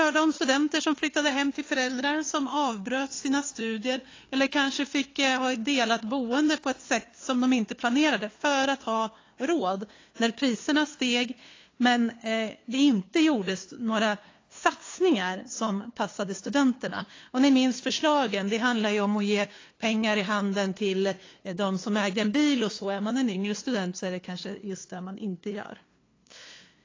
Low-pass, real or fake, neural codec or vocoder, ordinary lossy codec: 7.2 kHz; fake; codec, 16 kHz, 2 kbps, FunCodec, trained on LibriTTS, 25 frames a second; AAC, 32 kbps